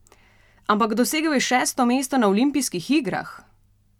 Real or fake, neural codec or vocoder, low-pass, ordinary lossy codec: real; none; 19.8 kHz; none